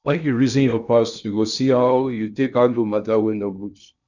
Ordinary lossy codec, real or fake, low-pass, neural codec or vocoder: none; fake; 7.2 kHz; codec, 16 kHz in and 24 kHz out, 0.6 kbps, FocalCodec, streaming, 2048 codes